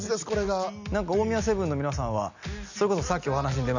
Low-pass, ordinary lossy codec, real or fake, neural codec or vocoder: 7.2 kHz; none; real; none